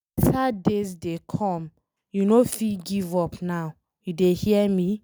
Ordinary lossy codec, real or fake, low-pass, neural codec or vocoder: none; real; none; none